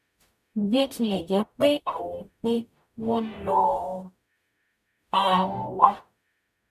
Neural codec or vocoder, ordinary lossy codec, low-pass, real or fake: codec, 44.1 kHz, 0.9 kbps, DAC; none; 14.4 kHz; fake